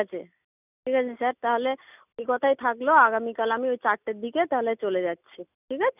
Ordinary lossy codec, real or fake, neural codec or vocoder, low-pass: none; real; none; 3.6 kHz